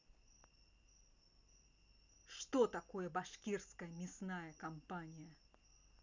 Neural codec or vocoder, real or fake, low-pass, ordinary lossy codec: none; real; 7.2 kHz; none